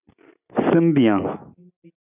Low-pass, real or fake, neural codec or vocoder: 3.6 kHz; real; none